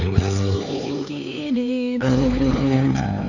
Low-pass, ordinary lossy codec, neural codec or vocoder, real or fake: 7.2 kHz; none; codec, 16 kHz, 4 kbps, X-Codec, HuBERT features, trained on LibriSpeech; fake